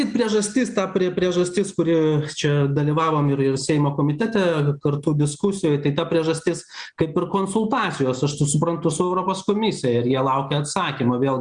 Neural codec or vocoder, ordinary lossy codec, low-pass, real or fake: none; Opus, 64 kbps; 9.9 kHz; real